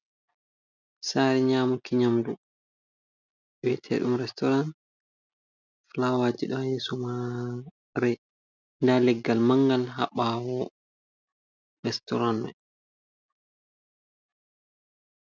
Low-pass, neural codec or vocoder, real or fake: 7.2 kHz; none; real